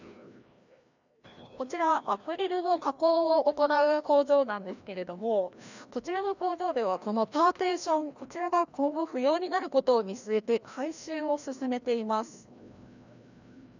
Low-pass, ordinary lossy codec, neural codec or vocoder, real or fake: 7.2 kHz; none; codec, 16 kHz, 1 kbps, FreqCodec, larger model; fake